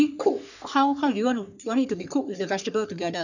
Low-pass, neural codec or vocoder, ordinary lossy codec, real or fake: 7.2 kHz; codec, 44.1 kHz, 3.4 kbps, Pupu-Codec; none; fake